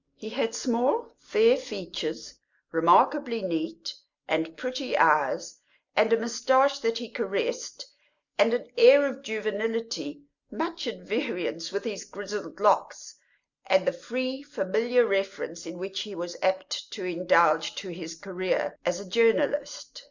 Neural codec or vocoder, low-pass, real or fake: none; 7.2 kHz; real